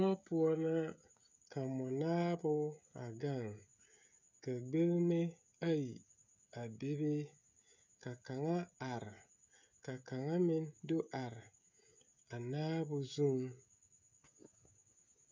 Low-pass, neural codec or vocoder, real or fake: 7.2 kHz; codec, 16 kHz, 16 kbps, FreqCodec, smaller model; fake